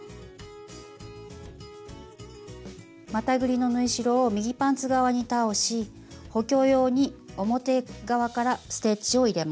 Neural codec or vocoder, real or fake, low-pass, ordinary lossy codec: none; real; none; none